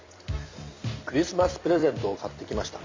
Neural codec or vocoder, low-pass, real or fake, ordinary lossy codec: vocoder, 44.1 kHz, 128 mel bands every 256 samples, BigVGAN v2; 7.2 kHz; fake; MP3, 48 kbps